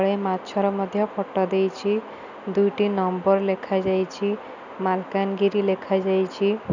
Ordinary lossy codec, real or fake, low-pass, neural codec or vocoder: none; real; 7.2 kHz; none